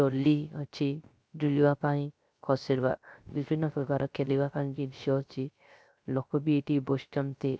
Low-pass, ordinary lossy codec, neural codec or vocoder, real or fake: none; none; codec, 16 kHz, 0.3 kbps, FocalCodec; fake